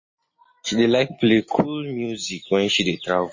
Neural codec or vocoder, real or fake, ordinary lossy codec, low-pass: none; real; MP3, 32 kbps; 7.2 kHz